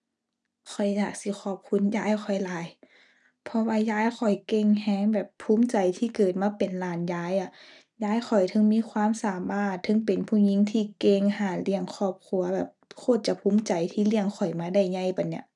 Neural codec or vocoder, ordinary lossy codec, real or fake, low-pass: none; none; real; 10.8 kHz